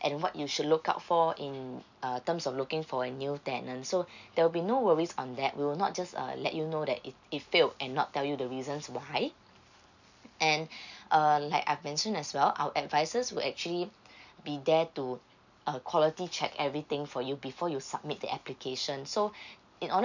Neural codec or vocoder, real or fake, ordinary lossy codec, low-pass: none; real; none; 7.2 kHz